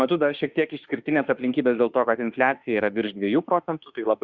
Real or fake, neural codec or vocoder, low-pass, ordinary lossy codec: fake; autoencoder, 48 kHz, 32 numbers a frame, DAC-VAE, trained on Japanese speech; 7.2 kHz; Opus, 64 kbps